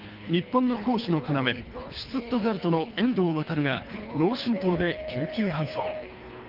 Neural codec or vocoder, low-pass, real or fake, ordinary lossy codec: codec, 24 kHz, 3 kbps, HILCodec; 5.4 kHz; fake; Opus, 24 kbps